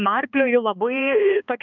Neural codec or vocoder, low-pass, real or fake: codec, 16 kHz, 2 kbps, X-Codec, HuBERT features, trained on balanced general audio; 7.2 kHz; fake